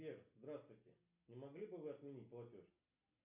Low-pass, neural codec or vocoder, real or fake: 3.6 kHz; none; real